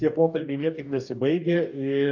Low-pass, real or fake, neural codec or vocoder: 7.2 kHz; fake; codec, 44.1 kHz, 2.6 kbps, DAC